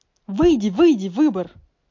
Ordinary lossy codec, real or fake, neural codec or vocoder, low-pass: MP3, 48 kbps; real; none; 7.2 kHz